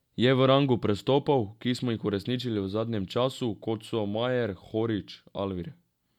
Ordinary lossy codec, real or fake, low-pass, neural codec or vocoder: none; real; 19.8 kHz; none